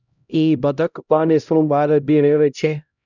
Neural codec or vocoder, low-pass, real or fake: codec, 16 kHz, 0.5 kbps, X-Codec, HuBERT features, trained on LibriSpeech; 7.2 kHz; fake